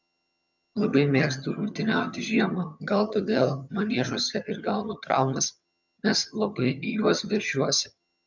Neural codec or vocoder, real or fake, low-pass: vocoder, 22.05 kHz, 80 mel bands, HiFi-GAN; fake; 7.2 kHz